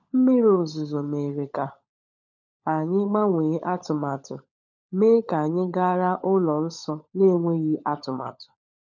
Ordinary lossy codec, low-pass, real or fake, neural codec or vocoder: none; 7.2 kHz; fake; codec, 16 kHz, 16 kbps, FunCodec, trained on LibriTTS, 50 frames a second